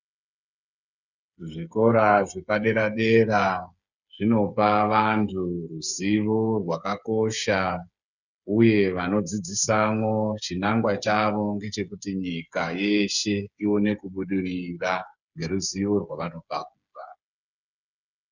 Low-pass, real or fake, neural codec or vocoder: 7.2 kHz; fake; codec, 16 kHz, 8 kbps, FreqCodec, smaller model